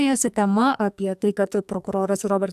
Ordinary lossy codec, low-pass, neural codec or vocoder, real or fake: AAC, 96 kbps; 14.4 kHz; codec, 44.1 kHz, 2.6 kbps, SNAC; fake